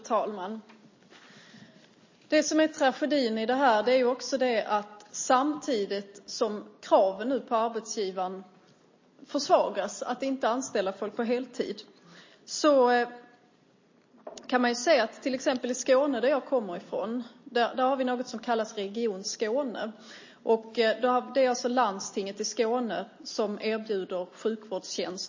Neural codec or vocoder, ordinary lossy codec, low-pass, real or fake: none; MP3, 32 kbps; 7.2 kHz; real